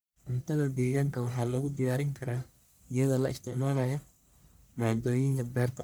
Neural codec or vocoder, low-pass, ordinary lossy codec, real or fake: codec, 44.1 kHz, 1.7 kbps, Pupu-Codec; none; none; fake